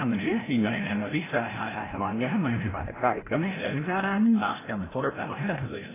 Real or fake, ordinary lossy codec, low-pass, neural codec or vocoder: fake; AAC, 16 kbps; 3.6 kHz; codec, 16 kHz, 0.5 kbps, FreqCodec, larger model